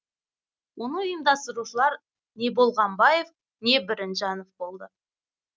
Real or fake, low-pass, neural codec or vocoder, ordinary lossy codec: real; none; none; none